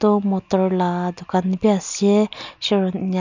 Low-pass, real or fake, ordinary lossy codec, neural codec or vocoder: 7.2 kHz; real; none; none